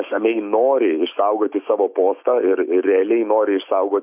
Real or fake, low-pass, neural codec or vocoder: fake; 3.6 kHz; autoencoder, 48 kHz, 128 numbers a frame, DAC-VAE, trained on Japanese speech